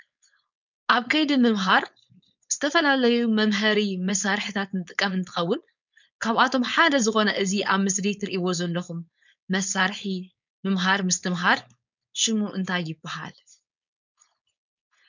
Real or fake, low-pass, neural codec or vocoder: fake; 7.2 kHz; codec, 16 kHz, 4.8 kbps, FACodec